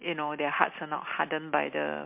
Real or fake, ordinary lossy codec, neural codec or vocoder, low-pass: real; MP3, 32 kbps; none; 3.6 kHz